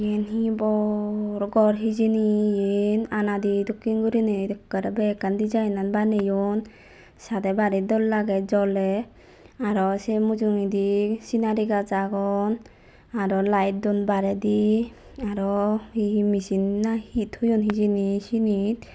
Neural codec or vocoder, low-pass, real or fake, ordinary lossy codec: none; none; real; none